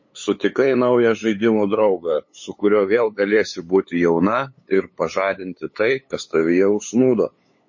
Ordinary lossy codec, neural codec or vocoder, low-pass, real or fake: MP3, 32 kbps; codec, 16 kHz, 8 kbps, FunCodec, trained on LibriTTS, 25 frames a second; 7.2 kHz; fake